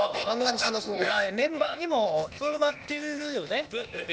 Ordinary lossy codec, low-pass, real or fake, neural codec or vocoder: none; none; fake; codec, 16 kHz, 0.8 kbps, ZipCodec